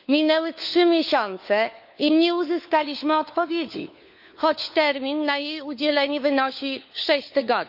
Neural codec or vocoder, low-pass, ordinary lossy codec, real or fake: codec, 16 kHz, 4 kbps, FunCodec, trained on LibriTTS, 50 frames a second; 5.4 kHz; none; fake